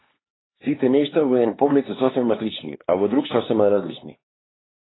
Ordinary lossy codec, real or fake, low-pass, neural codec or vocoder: AAC, 16 kbps; fake; 7.2 kHz; codec, 16 kHz, 2 kbps, X-Codec, HuBERT features, trained on LibriSpeech